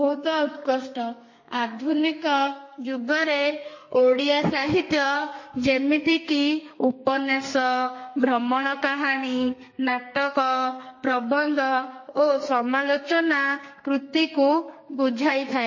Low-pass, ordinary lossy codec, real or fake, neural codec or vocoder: 7.2 kHz; MP3, 32 kbps; fake; codec, 32 kHz, 1.9 kbps, SNAC